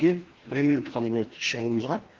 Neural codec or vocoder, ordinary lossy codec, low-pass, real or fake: codec, 16 kHz, 1 kbps, FreqCodec, larger model; Opus, 16 kbps; 7.2 kHz; fake